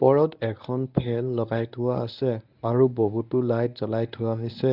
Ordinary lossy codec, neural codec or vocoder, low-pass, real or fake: none; codec, 24 kHz, 0.9 kbps, WavTokenizer, medium speech release version 1; 5.4 kHz; fake